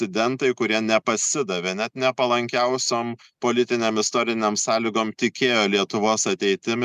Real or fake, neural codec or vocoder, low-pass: real; none; 14.4 kHz